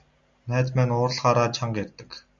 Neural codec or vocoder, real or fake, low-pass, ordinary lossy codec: none; real; 7.2 kHz; Opus, 64 kbps